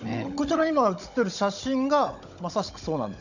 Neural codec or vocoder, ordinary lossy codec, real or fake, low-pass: codec, 16 kHz, 16 kbps, FunCodec, trained on Chinese and English, 50 frames a second; none; fake; 7.2 kHz